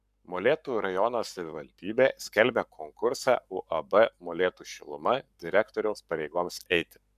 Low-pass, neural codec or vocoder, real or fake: 14.4 kHz; codec, 44.1 kHz, 7.8 kbps, Pupu-Codec; fake